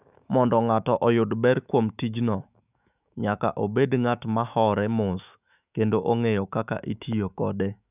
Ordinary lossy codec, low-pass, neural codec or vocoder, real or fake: none; 3.6 kHz; autoencoder, 48 kHz, 128 numbers a frame, DAC-VAE, trained on Japanese speech; fake